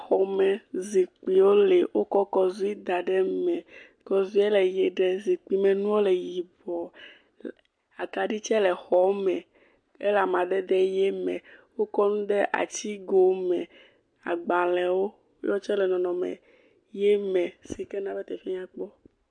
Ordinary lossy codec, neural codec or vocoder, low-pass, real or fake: MP3, 64 kbps; none; 9.9 kHz; real